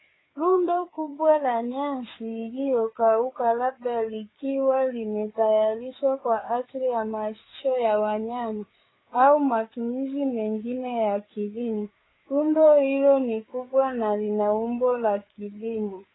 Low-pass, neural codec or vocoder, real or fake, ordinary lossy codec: 7.2 kHz; codec, 16 kHz, 4 kbps, FreqCodec, larger model; fake; AAC, 16 kbps